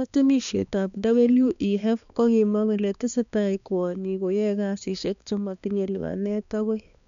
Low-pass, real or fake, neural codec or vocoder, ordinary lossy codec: 7.2 kHz; fake; codec, 16 kHz, 2 kbps, X-Codec, HuBERT features, trained on balanced general audio; MP3, 96 kbps